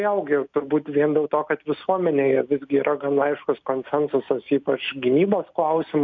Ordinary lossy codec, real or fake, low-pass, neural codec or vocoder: MP3, 64 kbps; real; 7.2 kHz; none